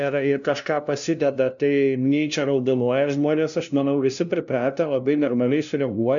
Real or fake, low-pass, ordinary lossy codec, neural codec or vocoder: fake; 7.2 kHz; MP3, 96 kbps; codec, 16 kHz, 0.5 kbps, FunCodec, trained on LibriTTS, 25 frames a second